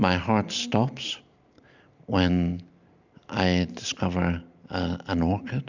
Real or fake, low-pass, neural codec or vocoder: real; 7.2 kHz; none